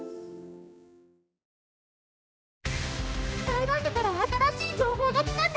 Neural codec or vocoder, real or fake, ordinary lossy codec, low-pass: codec, 16 kHz, 1 kbps, X-Codec, HuBERT features, trained on general audio; fake; none; none